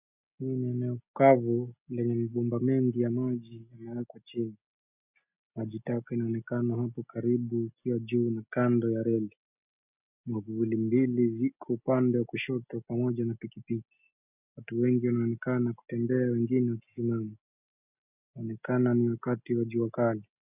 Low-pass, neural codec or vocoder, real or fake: 3.6 kHz; none; real